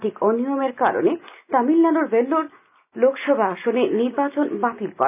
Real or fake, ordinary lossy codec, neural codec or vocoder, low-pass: real; none; none; 3.6 kHz